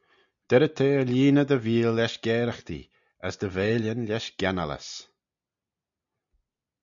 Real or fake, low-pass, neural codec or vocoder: real; 7.2 kHz; none